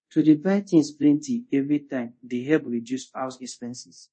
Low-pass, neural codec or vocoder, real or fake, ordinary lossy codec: 9.9 kHz; codec, 24 kHz, 0.5 kbps, DualCodec; fake; MP3, 32 kbps